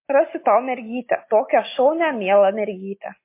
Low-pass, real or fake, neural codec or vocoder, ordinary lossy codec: 3.6 kHz; real; none; MP3, 24 kbps